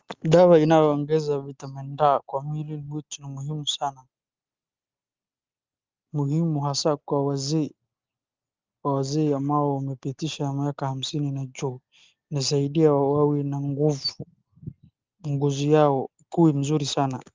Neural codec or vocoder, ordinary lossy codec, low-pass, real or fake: none; Opus, 32 kbps; 7.2 kHz; real